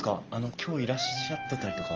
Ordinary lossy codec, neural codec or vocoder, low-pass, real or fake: Opus, 24 kbps; none; 7.2 kHz; real